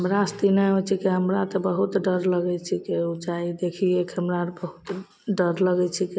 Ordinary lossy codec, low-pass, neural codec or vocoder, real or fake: none; none; none; real